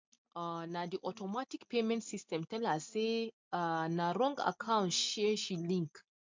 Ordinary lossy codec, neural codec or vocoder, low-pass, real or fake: MP3, 64 kbps; none; 7.2 kHz; real